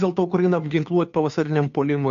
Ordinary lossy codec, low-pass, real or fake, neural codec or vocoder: AAC, 64 kbps; 7.2 kHz; fake; codec, 16 kHz, 2 kbps, FunCodec, trained on Chinese and English, 25 frames a second